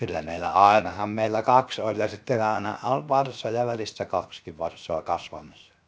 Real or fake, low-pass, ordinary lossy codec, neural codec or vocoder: fake; none; none; codec, 16 kHz, 0.7 kbps, FocalCodec